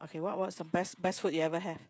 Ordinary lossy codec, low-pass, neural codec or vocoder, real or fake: none; none; codec, 16 kHz, 16 kbps, FreqCodec, smaller model; fake